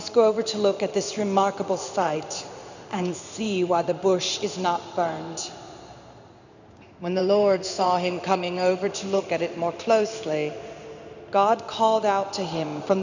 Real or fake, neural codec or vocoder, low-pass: fake; codec, 16 kHz in and 24 kHz out, 1 kbps, XY-Tokenizer; 7.2 kHz